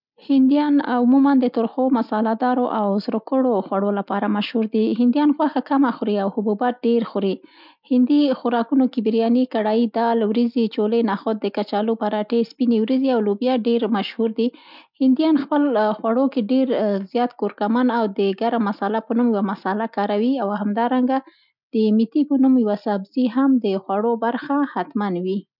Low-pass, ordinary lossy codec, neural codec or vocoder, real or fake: 5.4 kHz; none; none; real